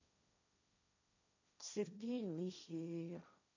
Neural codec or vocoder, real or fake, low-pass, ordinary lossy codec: codec, 16 kHz, 1.1 kbps, Voila-Tokenizer; fake; 7.2 kHz; none